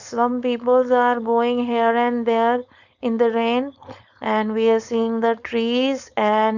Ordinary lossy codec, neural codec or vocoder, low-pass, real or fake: none; codec, 16 kHz, 4.8 kbps, FACodec; 7.2 kHz; fake